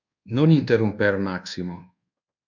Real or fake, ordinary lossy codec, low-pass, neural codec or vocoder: fake; MP3, 64 kbps; 7.2 kHz; codec, 24 kHz, 1.2 kbps, DualCodec